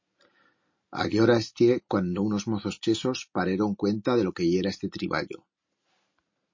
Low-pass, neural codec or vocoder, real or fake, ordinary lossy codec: 7.2 kHz; none; real; MP3, 32 kbps